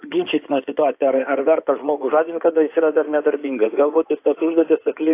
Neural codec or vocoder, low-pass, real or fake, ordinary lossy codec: codec, 16 kHz in and 24 kHz out, 2.2 kbps, FireRedTTS-2 codec; 3.6 kHz; fake; AAC, 24 kbps